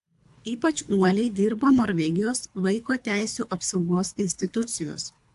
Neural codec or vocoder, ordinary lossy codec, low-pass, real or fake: codec, 24 kHz, 3 kbps, HILCodec; AAC, 64 kbps; 10.8 kHz; fake